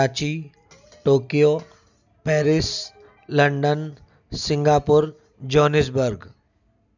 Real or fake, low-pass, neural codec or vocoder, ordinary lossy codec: real; 7.2 kHz; none; none